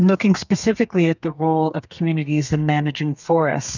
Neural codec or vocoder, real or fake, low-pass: codec, 32 kHz, 1.9 kbps, SNAC; fake; 7.2 kHz